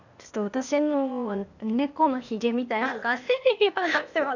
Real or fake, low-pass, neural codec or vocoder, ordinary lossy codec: fake; 7.2 kHz; codec, 16 kHz, 0.8 kbps, ZipCodec; none